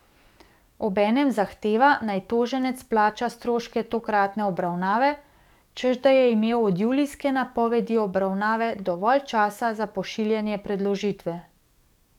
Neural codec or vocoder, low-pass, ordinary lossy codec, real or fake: codec, 44.1 kHz, 7.8 kbps, DAC; 19.8 kHz; none; fake